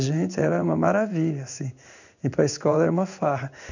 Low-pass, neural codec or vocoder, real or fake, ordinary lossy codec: 7.2 kHz; codec, 16 kHz in and 24 kHz out, 1 kbps, XY-Tokenizer; fake; none